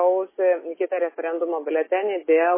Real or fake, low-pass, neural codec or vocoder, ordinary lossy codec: real; 3.6 kHz; none; MP3, 16 kbps